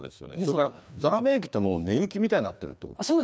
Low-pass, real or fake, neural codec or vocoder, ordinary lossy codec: none; fake; codec, 16 kHz, 2 kbps, FreqCodec, larger model; none